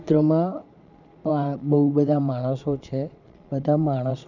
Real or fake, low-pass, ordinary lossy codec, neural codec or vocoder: fake; 7.2 kHz; none; vocoder, 22.05 kHz, 80 mel bands, Vocos